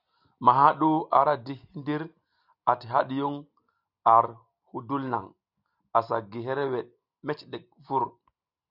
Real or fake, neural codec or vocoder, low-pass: real; none; 5.4 kHz